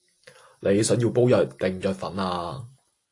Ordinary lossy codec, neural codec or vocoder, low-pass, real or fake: AAC, 48 kbps; none; 10.8 kHz; real